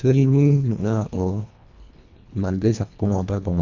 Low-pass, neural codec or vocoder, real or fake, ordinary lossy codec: 7.2 kHz; codec, 24 kHz, 1.5 kbps, HILCodec; fake; none